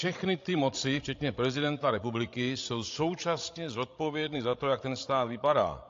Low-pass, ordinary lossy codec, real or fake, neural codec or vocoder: 7.2 kHz; AAC, 48 kbps; fake; codec, 16 kHz, 16 kbps, FreqCodec, larger model